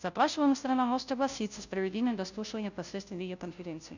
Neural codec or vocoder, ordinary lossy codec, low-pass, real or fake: codec, 16 kHz, 0.5 kbps, FunCodec, trained on Chinese and English, 25 frames a second; none; 7.2 kHz; fake